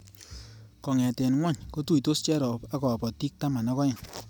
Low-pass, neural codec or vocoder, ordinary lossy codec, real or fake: none; none; none; real